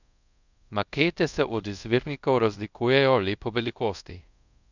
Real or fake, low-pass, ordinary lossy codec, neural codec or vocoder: fake; 7.2 kHz; none; codec, 24 kHz, 0.5 kbps, DualCodec